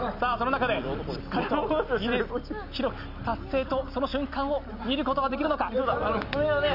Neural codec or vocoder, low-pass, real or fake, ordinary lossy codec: none; 5.4 kHz; real; none